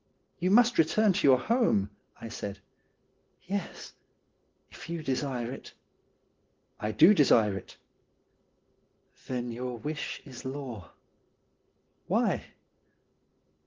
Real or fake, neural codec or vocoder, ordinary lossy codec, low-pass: real; none; Opus, 16 kbps; 7.2 kHz